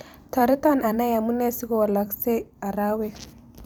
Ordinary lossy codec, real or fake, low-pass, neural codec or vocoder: none; fake; none; vocoder, 44.1 kHz, 128 mel bands every 256 samples, BigVGAN v2